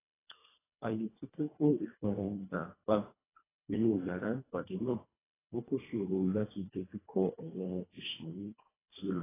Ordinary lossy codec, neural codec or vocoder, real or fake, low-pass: AAC, 16 kbps; codec, 24 kHz, 1.5 kbps, HILCodec; fake; 3.6 kHz